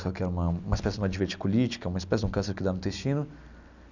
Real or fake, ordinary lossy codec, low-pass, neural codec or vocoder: real; none; 7.2 kHz; none